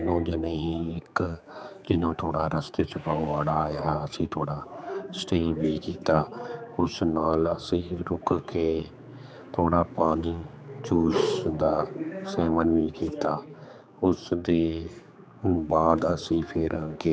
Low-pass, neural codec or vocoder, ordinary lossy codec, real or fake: none; codec, 16 kHz, 4 kbps, X-Codec, HuBERT features, trained on general audio; none; fake